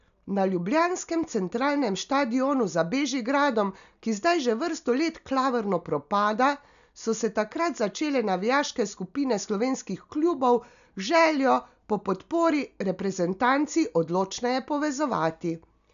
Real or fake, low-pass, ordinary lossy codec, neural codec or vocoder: real; 7.2 kHz; none; none